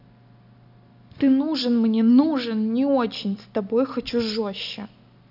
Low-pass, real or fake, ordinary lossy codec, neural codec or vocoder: 5.4 kHz; real; none; none